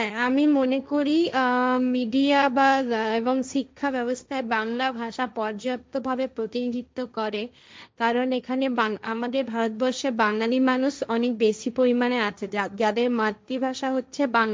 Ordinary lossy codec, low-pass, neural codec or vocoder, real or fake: none; none; codec, 16 kHz, 1.1 kbps, Voila-Tokenizer; fake